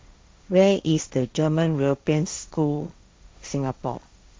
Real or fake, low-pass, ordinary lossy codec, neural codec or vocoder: fake; none; none; codec, 16 kHz, 1.1 kbps, Voila-Tokenizer